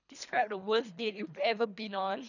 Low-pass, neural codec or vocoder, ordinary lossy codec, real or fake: 7.2 kHz; codec, 24 kHz, 3 kbps, HILCodec; none; fake